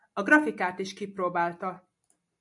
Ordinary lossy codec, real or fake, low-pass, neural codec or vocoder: AAC, 64 kbps; real; 10.8 kHz; none